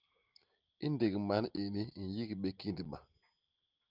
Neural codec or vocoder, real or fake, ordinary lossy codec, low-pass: none; real; Opus, 32 kbps; 5.4 kHz